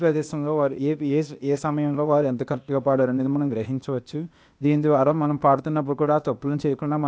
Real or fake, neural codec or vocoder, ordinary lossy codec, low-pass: fake; codec, 16 kHz, 0.8 kbps, ZipCodec; none; none